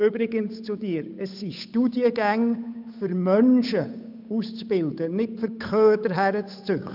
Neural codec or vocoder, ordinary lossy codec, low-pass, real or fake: codec, 16 kHz, 8 kbps, FunCodec, trained on Chinese and English, 25 frames a second; none; 5.4 kHz; fake